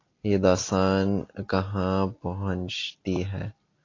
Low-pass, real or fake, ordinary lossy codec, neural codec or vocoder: 7.2 kHz; real; AAC, 32 kbps; none